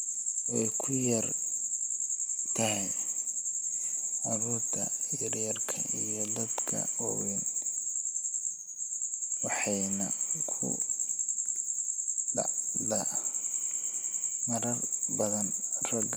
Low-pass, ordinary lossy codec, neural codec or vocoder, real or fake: none; none; none; real